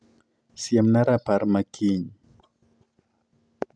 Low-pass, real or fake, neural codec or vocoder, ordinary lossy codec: 9.9 kHz; real; none; MP3, 96 kbps